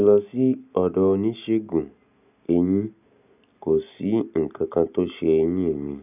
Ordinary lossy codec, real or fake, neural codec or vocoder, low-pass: none; real; none; 3.6 kHz